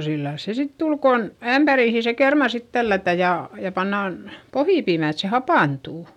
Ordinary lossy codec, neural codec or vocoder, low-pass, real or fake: none; none; 19.8 kHz; real